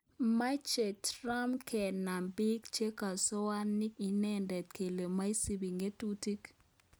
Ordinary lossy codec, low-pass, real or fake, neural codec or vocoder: none; none; real; none